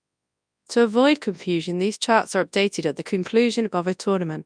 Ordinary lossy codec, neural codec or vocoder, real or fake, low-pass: none; codec, 24 kHz, 0.9 kbps, WavTokenizer, large speech release; fake; 9.9 kHz